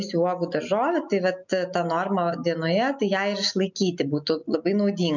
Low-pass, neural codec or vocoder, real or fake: 7.2 kHz; none; real